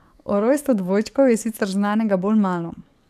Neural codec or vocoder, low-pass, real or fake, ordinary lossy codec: codec, 44.1 kHz, 7.8 kbps, DAC; 14.4 kHz; fake; none